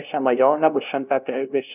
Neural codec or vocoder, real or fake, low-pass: codec, 16 kHz, 0.5 kbps, FunCodec, trained on LibriTTS, 25 frames a second; fake; 3.6 kHz